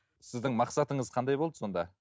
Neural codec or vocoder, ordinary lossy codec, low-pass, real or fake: none; none; none; real